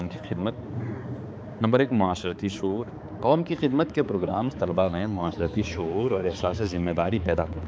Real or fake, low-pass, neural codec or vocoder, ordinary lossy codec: fake; none; codec, 16 kHz, 4 kbps, X-Codec, HuBERT features, trained on balanced general audio; none